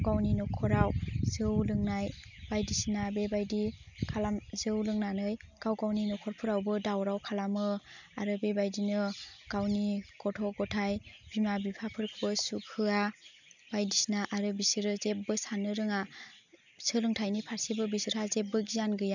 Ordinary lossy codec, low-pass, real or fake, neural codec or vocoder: none; 7.2 kHz; real; none